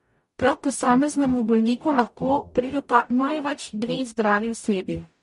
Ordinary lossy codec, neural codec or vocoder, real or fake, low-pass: MP3, 48 kbps; codec, 44.1 kHz, 0.9 kbps, DAC; fake; 14.4 kHz